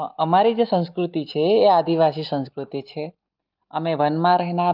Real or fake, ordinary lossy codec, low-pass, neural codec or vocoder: real; Opus, 24 kbps; 5.4 kHz; none